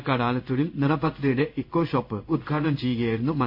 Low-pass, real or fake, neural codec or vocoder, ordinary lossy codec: 5.4 kHz; fake; codec, 16 kHz in and 24 kHz out, 1 kbps, XY-Tokenizer; MP3, 48 kbps